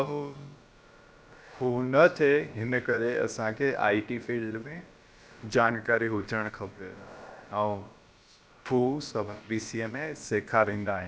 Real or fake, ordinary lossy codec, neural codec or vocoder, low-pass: fake; none; codec, 16 kHz, about 1 kbps, DyCAST, with the encoder's durations; none